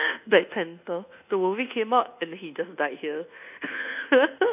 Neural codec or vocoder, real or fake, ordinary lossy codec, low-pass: codec, 24 kHz, 1.2 kbps, DualCodec; fake; none; 3.6 kHz